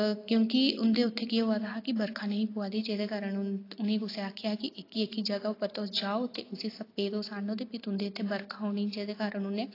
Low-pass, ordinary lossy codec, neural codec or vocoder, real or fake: 5.4 kHz; AAC, 32 kbps; none; real